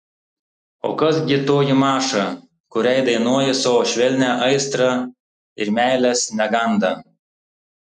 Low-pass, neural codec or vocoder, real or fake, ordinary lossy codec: 10.8 kHz; none; real; MP3, 96 kbps